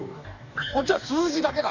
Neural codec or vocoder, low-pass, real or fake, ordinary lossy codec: codec, 44.1 kHz, 2.6 kbps, DAC; 7.2 kHz; fake; none